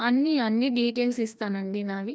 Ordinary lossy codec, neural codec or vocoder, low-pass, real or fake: none; codec, 16 kHz, 1 kbps, FreqCodec, larger model; none; fake